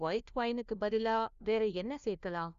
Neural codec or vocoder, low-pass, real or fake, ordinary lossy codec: codec, 16 kHz, 1 kbps, FunCodec, trained on LibriTTS, 50 frames a second; 7.2 kHz; fake; Opus, 64 kbps